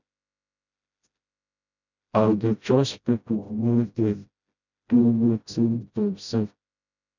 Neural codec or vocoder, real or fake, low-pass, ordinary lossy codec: codec, 16 kHz, 0.5 kbps, FreqCodec, smaller model; fake; 7.2 kHz; none